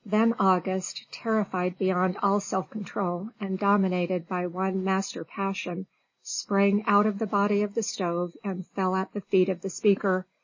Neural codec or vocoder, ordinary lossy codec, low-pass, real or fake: none; MP3, 32 kbps; 7.2 kHz; real